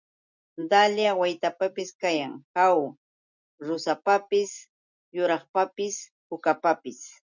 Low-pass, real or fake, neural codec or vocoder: 7.2 kHz; real; none